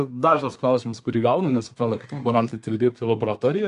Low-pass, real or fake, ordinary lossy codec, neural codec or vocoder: 10.8 kHz; fake; AAC, 64 kbps; codec, 24 kHz, 1 kbps, SNAC